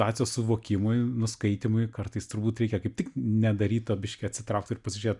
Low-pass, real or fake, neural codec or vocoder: 10.8 kHz; real; none